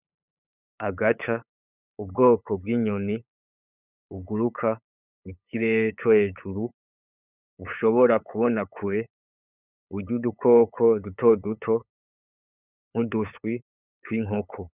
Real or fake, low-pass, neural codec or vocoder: fake; 3.6 kHz; codec, 16 kHz, 8 kbps, FunCodec, trained on LibriTTS, 25 frames a second